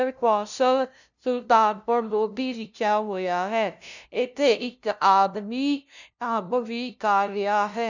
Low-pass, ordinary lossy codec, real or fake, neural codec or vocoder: 7.2 kHz; none; fake; codec, 16 kHz, 0.5 kbps, FunCodec, trained on LibriTTS, 25 frames a second